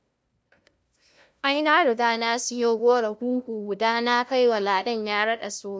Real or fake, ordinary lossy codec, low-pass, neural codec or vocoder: fake; none; none; codec, 16 kHz, 0.5 kbps, FunCodec, trained on LibriTTS, 25 frames a second